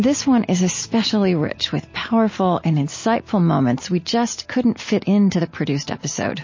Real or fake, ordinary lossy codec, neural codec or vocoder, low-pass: real; MP3, 32 kbps; none; 7.2 kHz